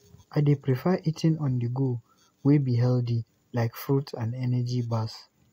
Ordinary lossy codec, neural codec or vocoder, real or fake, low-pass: AAC, 48 kbps; none; real; 19.8 kHz